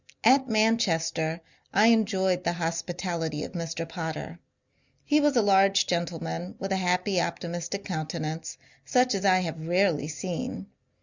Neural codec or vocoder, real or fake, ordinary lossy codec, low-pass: none; real; Opus, 64 kbps; 7.2 kHz